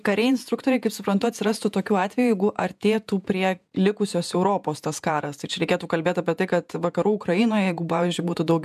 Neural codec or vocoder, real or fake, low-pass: vocoder, 48 kHz, 128 mel bands, Vocos; fake; 14.4 kHz